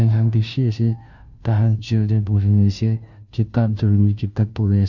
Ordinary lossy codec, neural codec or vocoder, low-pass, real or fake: Opus, 64 kbps; codec, 16 kHz, 0.5 kbps, FunCodec, trained on Chinese and English, 25 frames a second; 7.2 kHz; fake